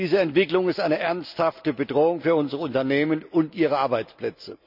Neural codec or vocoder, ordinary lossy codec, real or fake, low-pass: none; none; real; 5.4 kHz